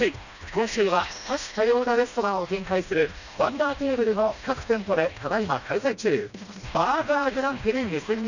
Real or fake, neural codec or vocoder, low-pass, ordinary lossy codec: fake; codec, 16 kHz, 1 kbps, FreqCodec, smaller model; 7.2 kHz; none